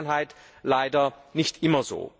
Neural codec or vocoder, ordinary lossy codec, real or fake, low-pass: none; none; real; none